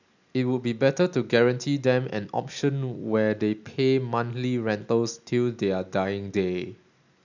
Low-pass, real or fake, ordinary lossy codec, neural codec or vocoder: 7.2 kHz; real; none; none